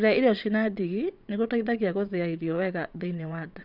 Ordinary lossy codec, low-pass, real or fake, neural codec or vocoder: none; 5.4 kHz; fake; vocoder, 22.05 kHz, 80 mel bands, WaveNeXt